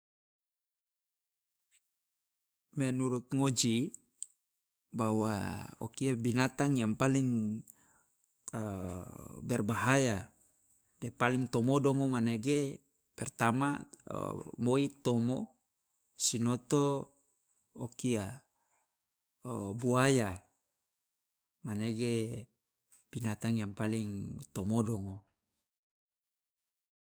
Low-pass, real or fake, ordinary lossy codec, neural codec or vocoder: none; fake; none; codec, 44.1 kHz, 7.8 kbps, DAC